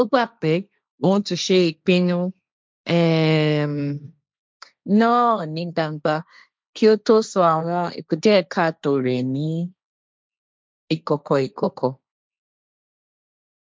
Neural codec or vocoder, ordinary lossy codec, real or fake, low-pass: codec, 16 kHz, 1.1 kbps, Voila-Tokenizer; none; fake; none